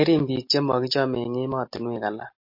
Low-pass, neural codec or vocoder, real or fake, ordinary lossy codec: 5.4 kHz; none; real; MP3, 32 kbps